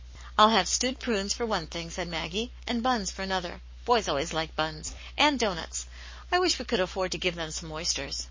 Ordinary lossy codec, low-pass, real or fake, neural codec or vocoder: MP3, 32 kbps; 7.2 kHz; fake; codec, 44.1 kHz, 7.8 kbps, Pupu-Codec